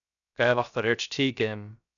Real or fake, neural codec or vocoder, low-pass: fake; codec, 16 kHz, 0.3 kbps, FocalCodec; 7.2 kHz